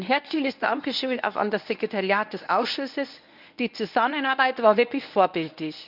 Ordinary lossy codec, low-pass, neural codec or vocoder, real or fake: none; 5.4 kHz; codec, 24 kHz, 0.9 kbps, WavTokenizer, medium speech release version 1; fake